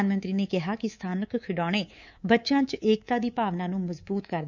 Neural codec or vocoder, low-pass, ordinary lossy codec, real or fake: codec, 24 kHz, 3.1 kbps, DualCodec; 7.2 kHz; none; fake